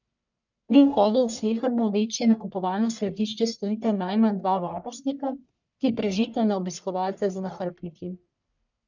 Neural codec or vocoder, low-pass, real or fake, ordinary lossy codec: codec, 44.1 kHz, 1.7 kbps, Pupu-Codec; 7.2 kHz; fake; none